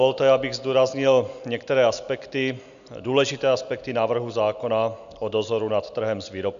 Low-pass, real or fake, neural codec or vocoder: 7.2 kHz; real; none